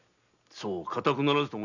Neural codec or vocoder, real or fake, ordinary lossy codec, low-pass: none; real; none; 7.2 kHz